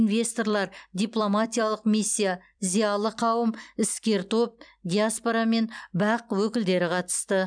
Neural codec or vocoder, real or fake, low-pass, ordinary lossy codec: vocoder, 44.1 kHz, 128 mel bands every 256 samples, BigVGAN v2; fake; 9.9 kHz; none